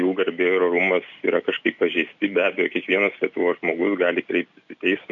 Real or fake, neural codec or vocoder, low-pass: real; none; 7.2 kHz